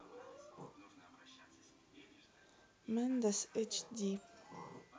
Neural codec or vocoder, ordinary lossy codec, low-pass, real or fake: none; none; none; real